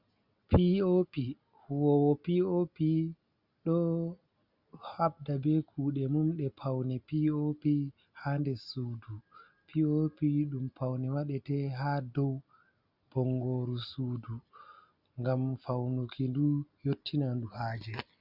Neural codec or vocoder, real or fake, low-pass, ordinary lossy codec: none; real; 5.4 kHz; Opus, 64 kbps